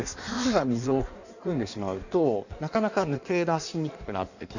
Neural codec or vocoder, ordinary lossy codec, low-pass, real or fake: codec, 16 kHz in and 24 kHz out, 1.1 kbps, FireRedTTS-2 codec; none; 7.2 kHz; fake